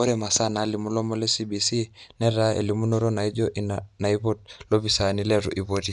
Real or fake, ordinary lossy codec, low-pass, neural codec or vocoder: real; none; 10.8 kHz; none